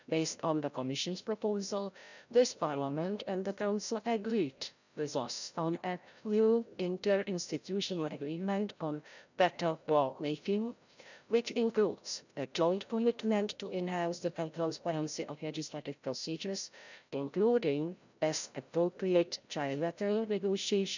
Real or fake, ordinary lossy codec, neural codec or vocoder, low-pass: fake; none; codec, 16 kHz, 0.5 kbps, FreqCodec, larger model; 7.2 kHz